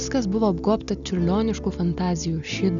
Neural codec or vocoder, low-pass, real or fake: none; 7.2 kHz; real